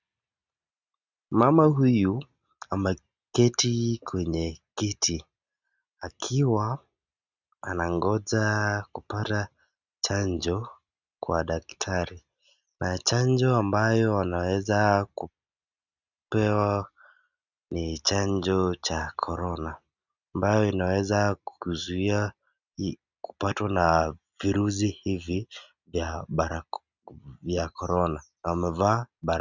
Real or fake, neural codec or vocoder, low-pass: real; none; 7.2 kHz